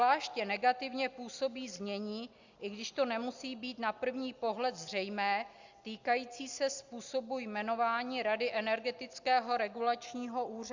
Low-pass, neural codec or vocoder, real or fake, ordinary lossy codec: 7.2 kHz; none; real; Opus, 64 kbps